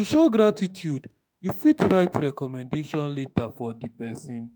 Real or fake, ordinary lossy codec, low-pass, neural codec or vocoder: fake; none; none; autoencoder, 48 kHz, 32 numbers a frame, DAC-VAE, trained on Japanese speech